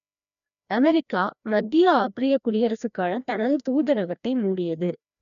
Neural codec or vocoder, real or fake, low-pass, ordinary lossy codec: codec, 16 kHz, 1 kbps, FreqCodec, larger model; fake; 7.2 kHz; none